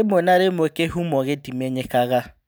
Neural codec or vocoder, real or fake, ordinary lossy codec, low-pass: none; real; none; none